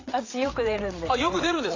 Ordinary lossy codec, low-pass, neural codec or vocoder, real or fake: AAC, 32 kbps; 7.2 kHz; codec, 16 kHz, 8 kbps, FreqCodec, larger model; fake